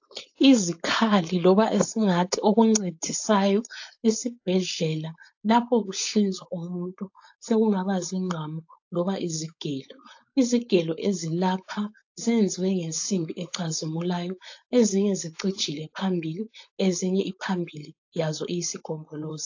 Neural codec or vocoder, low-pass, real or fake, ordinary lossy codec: codec, 16 kHz, 4.8 kbps, FACodec; 7.2 kHz; fake; AAC, 48 kbps